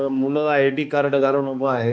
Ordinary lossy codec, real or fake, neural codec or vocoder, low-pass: none; fake; codec, 16 kHz, 2 kbps, X-Codec, HuBERT features, trained on balanced general audio; none